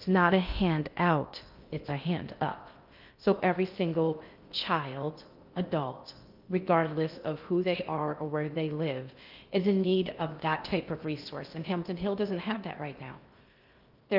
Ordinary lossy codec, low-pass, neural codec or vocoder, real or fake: Opus, 24 kbps; 5.4 kHz; codec, 16 kHz in and 24 kHz out, 0.6 kbps, FocalCodec, streaming, 2048 codes; fake